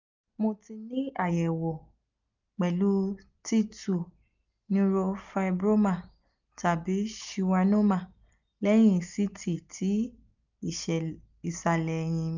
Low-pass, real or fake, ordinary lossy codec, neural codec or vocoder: 7.2 kHz; real; none; none